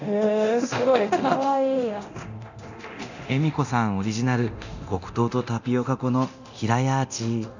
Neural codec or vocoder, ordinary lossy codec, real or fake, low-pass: codec, 24 kHz, 0.9 kbps, DualCodec; none; fake; 7.2 kHz